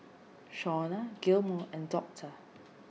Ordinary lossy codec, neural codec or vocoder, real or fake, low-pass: none; none; real; none